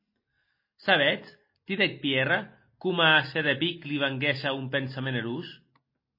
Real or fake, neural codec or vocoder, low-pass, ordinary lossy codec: real; none; 5.4 kHz; MP3, 24 kbps